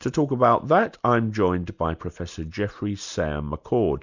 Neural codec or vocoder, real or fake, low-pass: none; real; 7.2 kHz